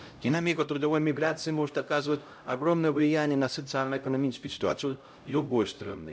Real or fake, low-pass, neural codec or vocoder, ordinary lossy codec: fake; none; codec, 16 kHz, 0.5 kbps, X-Codec, HuBERT features, trained on LibriSpeech; none